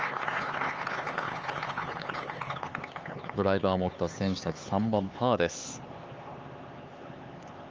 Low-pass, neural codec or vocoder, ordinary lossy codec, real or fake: 7.2 kHz; codec, 16 kHz, 4 kbps, X-Codec, HuBERT features, trained on LibriSpeech; Opus, 24 kbps; fake